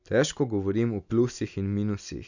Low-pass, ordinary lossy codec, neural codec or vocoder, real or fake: 7.2 kHz; none; none; real